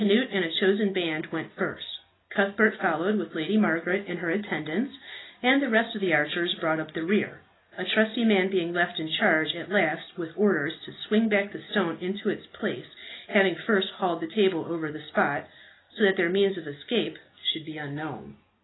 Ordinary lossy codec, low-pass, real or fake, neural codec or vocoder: AAC, 16 kbps; 7.2 kHz; real; none